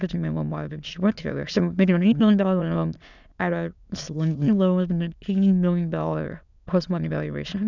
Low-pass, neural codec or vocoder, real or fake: 7.2 kHz; autoencoder, 22.05 kHz, a latent of 192 numbers a frame, VITS, trained on many speakers; fake